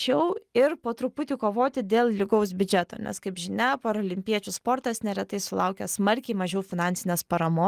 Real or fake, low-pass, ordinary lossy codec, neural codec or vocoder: real; 14.4 kHz; Opus, 24 kbps; none